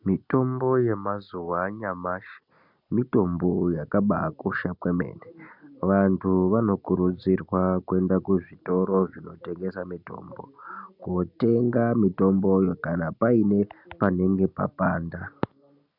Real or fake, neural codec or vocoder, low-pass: real; none; 5.4 kHz